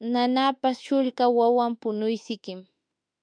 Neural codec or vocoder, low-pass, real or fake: autoencoder, 48 kHz, 32 numbers a frame, DAC-VAE, trained on Japanese speech; 9.9 kHz; fake